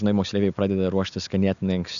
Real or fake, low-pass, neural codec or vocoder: real; 7.2 kHz; none